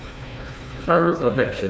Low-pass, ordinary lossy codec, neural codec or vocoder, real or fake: none; none; codec, 16 kHz, 1 kbps, FunCodec, trained on Chinese and English, 50 frames a second; fake